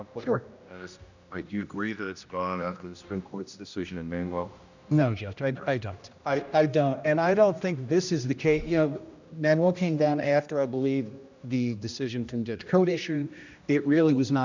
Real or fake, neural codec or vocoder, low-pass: fake; codec, 16 kHz, 1 kbps, X-Codec, HuBERT features, trained on general audio; 7.2 kHz